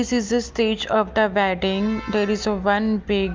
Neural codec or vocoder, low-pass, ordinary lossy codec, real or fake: none; 7.2 kHz; Opus, 32 kbps; real